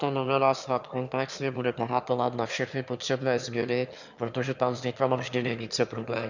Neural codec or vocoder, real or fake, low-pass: autoencoder, 22.05 kHz, a latent of 192 numbers a frame, VITS, trained on one speaker; fake; 7.2 kHz